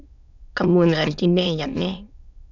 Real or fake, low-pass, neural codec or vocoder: fake; 7.2 kHz; autoencoder, 22.05 kHz, a latent of 192 numbers a frame, VITS, trained on many speakers